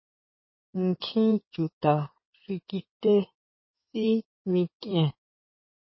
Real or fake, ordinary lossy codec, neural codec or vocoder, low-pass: fake; MP3, 24 kbps; codec, 16 kHz in and 24 kHz out, 2.2 kbps, FireRedTTS-2 codec; 7.2 kHz